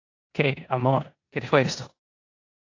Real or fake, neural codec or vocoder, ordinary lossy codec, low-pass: fake; codec, 16 kHz in and 24 kHz out, 0.9 kbps, LongCat-Audio-Codec, four codebook decoder; AAC, 48 kbps; 7.2 kHz